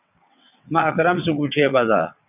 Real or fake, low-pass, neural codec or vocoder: fake; 3.6 kHz; vocoder, 22.05 kHz, 80 mel bands, WaveNeXt